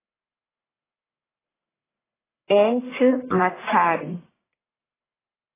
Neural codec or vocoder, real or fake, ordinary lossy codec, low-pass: codec, 44.1 kHz, 1.7 kbps, Pupu-Codec; fake; AAC, 16 kbps; 3.6 kHz